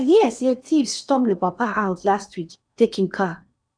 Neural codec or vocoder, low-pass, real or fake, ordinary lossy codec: codec, 16 kHz in and 24 kHz out, 0.8 kbps, FocalCodec, streaming, 65536 codes; 9.9 kHz; fake; none